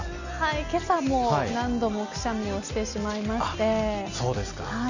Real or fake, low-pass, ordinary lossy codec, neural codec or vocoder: real; 7.2 kHz; none; none